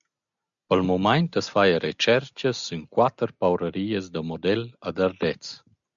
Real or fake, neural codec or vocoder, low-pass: real; none; 7.2 kHz